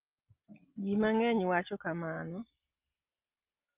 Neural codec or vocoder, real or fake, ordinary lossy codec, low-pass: none; real; Opus, 32 kbps; 3.6 kHz